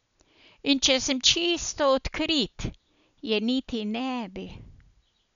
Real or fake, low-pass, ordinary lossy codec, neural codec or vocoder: real; 7.2 kHz; none; none